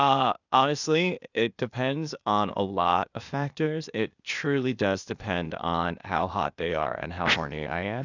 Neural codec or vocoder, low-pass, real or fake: codec, 16 kHz, 0.8 kbps, ZipCodec; 7.2 kHz; fake